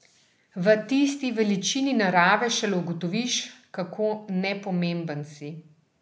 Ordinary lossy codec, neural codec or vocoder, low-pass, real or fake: none; none; none; real